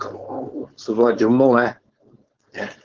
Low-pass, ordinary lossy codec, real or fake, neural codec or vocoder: 7.2 kHz; Opus, 16 kbps; fake; codec, 16 kHz, 4.8 kbps, FACodec